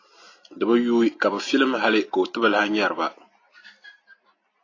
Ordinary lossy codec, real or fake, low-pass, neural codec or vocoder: AAC, 32 kbps; real; 7.2 kHz; none